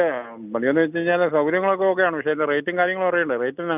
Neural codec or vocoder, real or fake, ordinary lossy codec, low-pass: none; real; none; 3.6 kHz